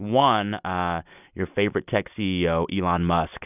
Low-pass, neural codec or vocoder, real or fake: 3.6 kHz; none; real